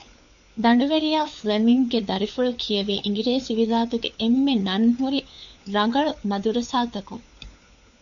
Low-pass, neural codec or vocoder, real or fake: 7.2 kHz; codec, 16 kHz, 4 kbps, FunCodec, trained on LibriTTS, 50 frames a second; fake